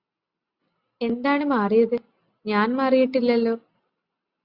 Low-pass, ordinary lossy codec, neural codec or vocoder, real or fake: 5.4 kHz; Opus, 64 kbps; none; real